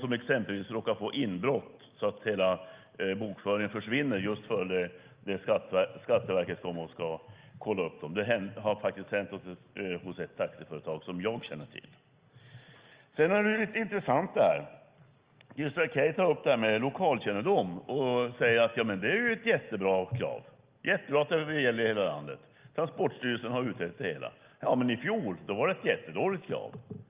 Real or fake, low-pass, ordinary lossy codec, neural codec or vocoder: real; 3.6 kHz; Opus, 24 kbps; none